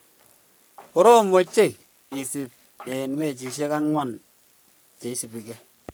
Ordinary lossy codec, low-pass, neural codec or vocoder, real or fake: none; none; codec, 44.1 kHz, 3.4 kbps, Pupu-Codec; fake